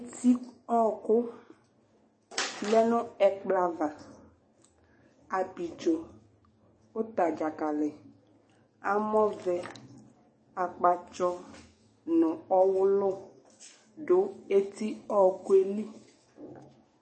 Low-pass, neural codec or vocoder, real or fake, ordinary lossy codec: 9.9 kHz; codec, 44.1 kHz, 7.8 kbps, DAC; fake; MP3, 32 kbps